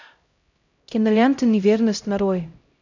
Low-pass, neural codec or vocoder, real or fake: 7.2 kHz; codec, 16 kHz, 0.5 kbps, X-Codec, HuBERT features, trained on LibriSpeech; fake